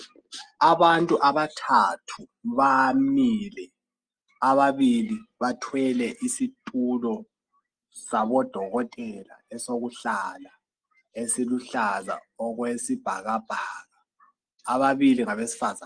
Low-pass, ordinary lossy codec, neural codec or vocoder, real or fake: 9.9 kHz; Opus, 24 kbps; none; real